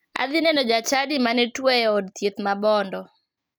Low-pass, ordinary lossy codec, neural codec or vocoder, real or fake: none; none; none; real